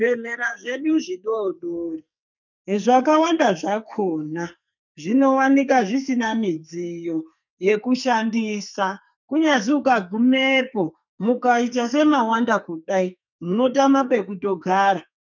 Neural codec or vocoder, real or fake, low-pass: codec, 44.1 kHz, 2.6 kbps, SNAC; fake; 7.2 kHz